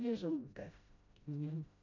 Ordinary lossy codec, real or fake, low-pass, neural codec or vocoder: none; fake; 7.2 kHz; codec, 16 kHz, 0.5 kbps, FreqCodec, smaller model